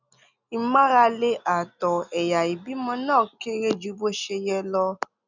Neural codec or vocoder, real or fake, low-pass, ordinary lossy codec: none; real; 7.2 kHz; none